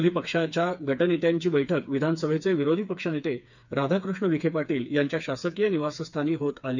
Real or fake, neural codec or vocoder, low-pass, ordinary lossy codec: fake; codec, 16 kHz, 4 kbps, FreqCodec, smaller model; 7.2 kHz; none